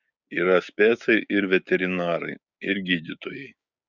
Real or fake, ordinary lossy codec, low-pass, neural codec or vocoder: fake; Opus, 64 kbps; 7.2 kHz; codec, 16 kHz, 6 kbps, DAC